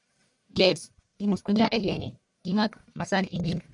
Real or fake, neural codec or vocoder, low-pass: fake; codec, 44.1 kHz, 1.7 kbps, Pupu-Codec; 10.8 kHz